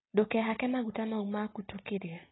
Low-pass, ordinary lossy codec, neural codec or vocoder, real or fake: 7.2 kHz; AAC, 16 kbps; none; real